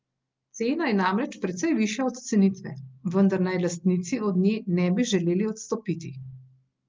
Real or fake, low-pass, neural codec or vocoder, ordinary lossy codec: real; 7.2 kHz; none; Opus, 32 kbps